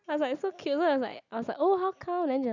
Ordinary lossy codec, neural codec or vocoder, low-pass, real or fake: none; none; 7.2 kHz; real